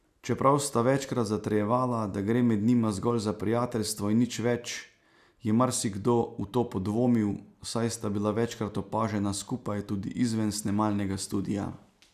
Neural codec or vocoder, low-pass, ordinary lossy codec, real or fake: none; 14.4 kHz; none; real